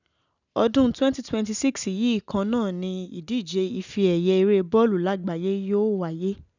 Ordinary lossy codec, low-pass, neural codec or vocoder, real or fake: none; 7.2 kHz; none; real